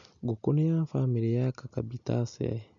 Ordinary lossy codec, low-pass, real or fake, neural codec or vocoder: none; 7.2 kHz; real; none